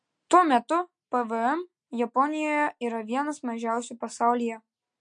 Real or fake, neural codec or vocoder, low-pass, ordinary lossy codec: real; none; 9.9 kHz; MP3, 48 kbps